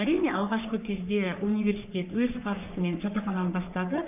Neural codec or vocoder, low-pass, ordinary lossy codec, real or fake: codec, 44.1 kHz, 3.4 kbps, Pupu-Codec; 3.6 kHz; none; fake